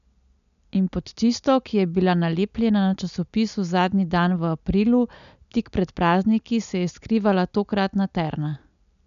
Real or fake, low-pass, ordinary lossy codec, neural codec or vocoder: real; 7.2 kHz; none; none